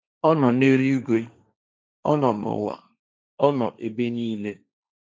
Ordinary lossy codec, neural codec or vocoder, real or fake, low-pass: none; codec, 16 kHz, 1.1 kbps, Voila-Tokenizer; fake; none